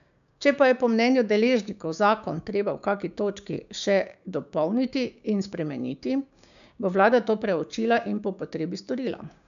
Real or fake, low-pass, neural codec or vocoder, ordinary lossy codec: fake; 7.2 kHz; codec, 16 kHz, 6 kbps, DAC; none